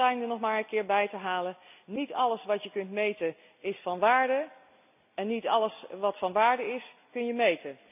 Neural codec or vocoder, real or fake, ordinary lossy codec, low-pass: none; real; none; 3.6 kHz